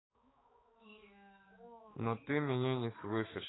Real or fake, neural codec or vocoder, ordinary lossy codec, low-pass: fake; codec, 16 kHz, 4 kbps, X-Codec, HuBERT features, trained on balanced general audio; AAC, 16 kbps; 7.2 kHz